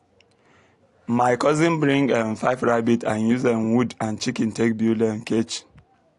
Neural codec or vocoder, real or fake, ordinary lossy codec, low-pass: none; real; AAC, 48 kbps; 10.8 kHz